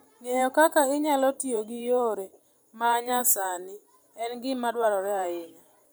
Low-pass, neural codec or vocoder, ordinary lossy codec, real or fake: none; vocoder, 44.1 kHz, 128 mel bands every 512 samples, BigVGAN v2; none; fake